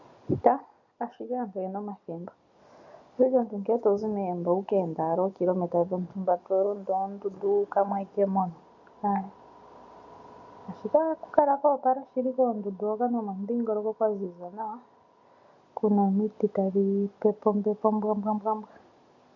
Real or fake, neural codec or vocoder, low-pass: real; none; 7.2 kHz